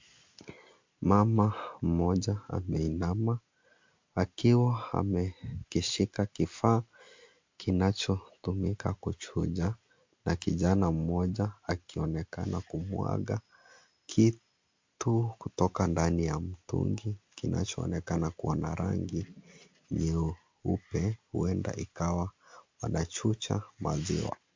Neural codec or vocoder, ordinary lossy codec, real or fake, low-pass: none; MP3, 48 kbps; real; 7.2 kHz